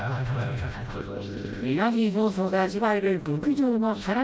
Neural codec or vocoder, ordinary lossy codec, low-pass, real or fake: codec, 16 kHz, 0.5 kbps, FreqCodec, smaller model; none; none; fake